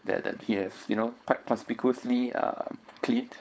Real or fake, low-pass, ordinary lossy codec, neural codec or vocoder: fake; none; none; codec, 16 kHz, 4.8 kbps, FACodec